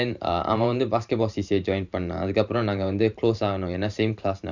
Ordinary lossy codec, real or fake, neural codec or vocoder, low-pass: none; fake; vocoder, 44.1 kHz, 128 mel bands every 512 samples, BigVGAN v2; 7.2 kHz